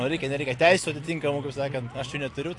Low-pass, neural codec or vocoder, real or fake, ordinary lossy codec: 10.8 kHz; none; real; AAC, 48 kbps